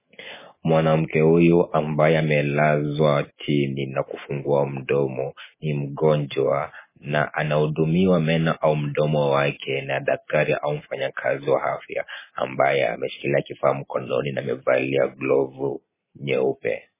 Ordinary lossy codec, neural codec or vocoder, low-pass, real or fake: MP3, 16 kbps; none; 3.6 kHz; real